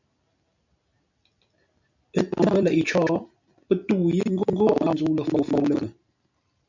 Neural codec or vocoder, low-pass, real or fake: none; 7.2 kHz; real